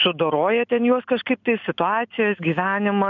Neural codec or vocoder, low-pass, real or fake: none; 7.2 kHz; real